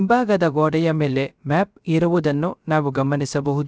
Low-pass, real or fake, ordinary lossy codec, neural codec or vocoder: none; fake; none; codec, 16 kHz, 0.3 kbps, FocalCodec